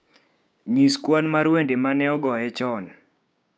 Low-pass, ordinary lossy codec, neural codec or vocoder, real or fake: none; none; codec, 16 kHz, 6 kbps, DAC; fake